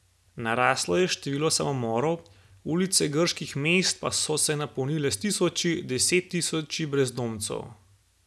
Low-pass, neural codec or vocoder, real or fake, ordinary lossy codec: none; none; real; none